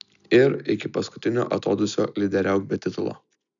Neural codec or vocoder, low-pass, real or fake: none; 7.2 kHz; real